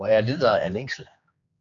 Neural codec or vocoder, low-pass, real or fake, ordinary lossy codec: codec, 16 kHz, 2 kbps, X-Codec, HuBERT features, trained on general audio; 7.2 kHz; fake; MP3, 96 kbps